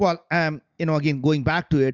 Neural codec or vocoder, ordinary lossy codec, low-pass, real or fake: none; Opus, 64 kbps; 7.2 kHz; real